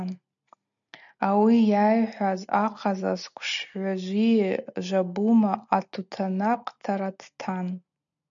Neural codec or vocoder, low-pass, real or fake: none; 7.2 kHz; real